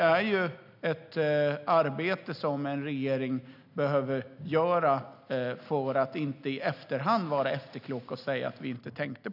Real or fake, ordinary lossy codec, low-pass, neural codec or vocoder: real; none; 5.4 kHz; none